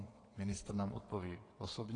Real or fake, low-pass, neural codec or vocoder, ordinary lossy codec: fake; 9.9 kHz; vocoder, 22.05 kHz, 80 mel bands, WaveNeXt; AAC, 32 kbps